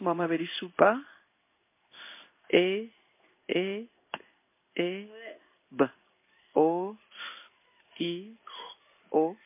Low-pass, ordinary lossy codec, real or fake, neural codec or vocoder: 3.6 kHz; MP3, 24 kbps; fake; codec, 16 kHz in and 24 kHz out, 1 kbps, XY-Tokenizer